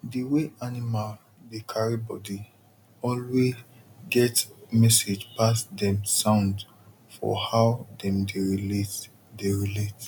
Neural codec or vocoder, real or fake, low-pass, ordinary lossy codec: none; real; 19.8 kHz; none